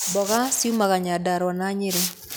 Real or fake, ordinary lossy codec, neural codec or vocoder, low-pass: real; none; none; none